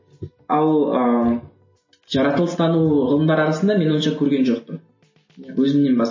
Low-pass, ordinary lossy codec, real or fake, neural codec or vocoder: 7.2 kHz; MP3, 32 kbps; real; none